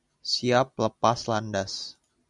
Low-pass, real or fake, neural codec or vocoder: 10.8 kHz; real; none